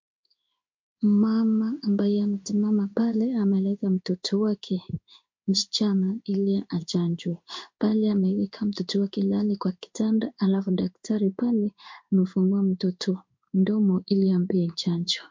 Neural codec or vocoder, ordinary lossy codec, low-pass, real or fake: codec, 16 kHz in and 24 kHz out, 1 kbps, XY-Tokenizer; MP3, 48 kbps; 7.2 kHz; fake